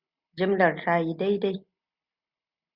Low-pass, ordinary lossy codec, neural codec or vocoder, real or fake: 5.4 kHz; Opus, 64 kbps; none; real